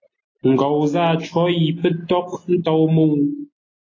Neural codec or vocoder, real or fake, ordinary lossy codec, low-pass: none; real; AAC, 32 kbps; 7.2 kHz